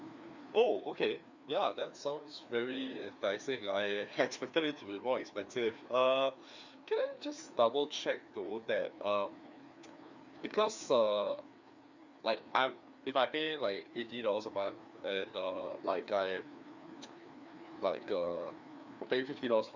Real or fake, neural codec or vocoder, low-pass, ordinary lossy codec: fake; codec, 16 kHz, 2 kbps, FreqCodec, larger model; 7.2 kHz; Opus, 64 kbps